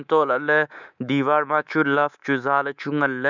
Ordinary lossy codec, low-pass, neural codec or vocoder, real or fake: none; 7.2 kHz; codec, 24 kHz, 3.1 kbps, DualCodec; fake